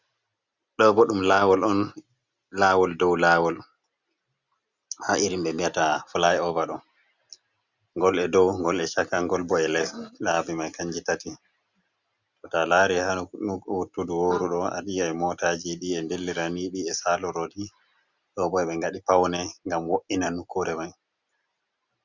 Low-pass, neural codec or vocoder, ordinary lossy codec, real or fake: 7.2 kHz; none; Opus, 64 kbps; real